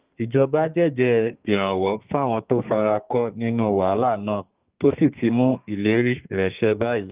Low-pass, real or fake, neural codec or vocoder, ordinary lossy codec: 3.6 kHz; fake; codec, 32 kHz, 1.9 kbps, SNAC; Opus, 24 kbps